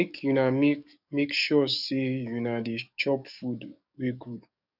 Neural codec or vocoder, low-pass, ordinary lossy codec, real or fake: codec, 44.1 kHz, 7.8 kbps, DAC; 5.4 kHz; none; fake